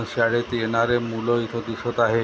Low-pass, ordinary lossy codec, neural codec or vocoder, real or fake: none; none; none; real